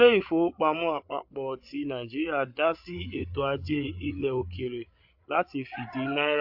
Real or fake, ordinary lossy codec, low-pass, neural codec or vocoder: fake; AAC, 48 kbps; 5.4 kHz; codec, 16 kHz, 8 kbps, FreqCodec, larger model